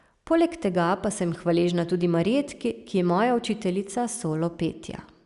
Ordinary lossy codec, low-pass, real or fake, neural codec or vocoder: Opus, 64 kbps; 10.8 kHz; real; none